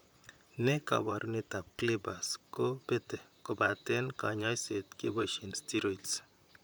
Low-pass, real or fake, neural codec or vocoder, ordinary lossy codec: none; fake; vocoder, 44.1 kHz, 128 mel bands, Pupu-Vocoder; none